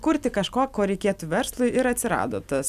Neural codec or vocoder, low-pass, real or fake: none; 14.4 kHz; real